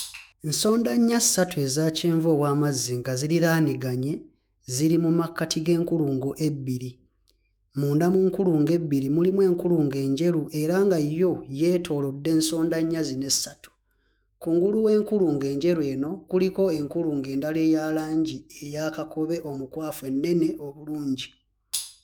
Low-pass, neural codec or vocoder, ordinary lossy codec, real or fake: none; autoencoder, 48 kHz, 128 numbers a frame, DAC-VAE, trained on Japanese speech; none; fake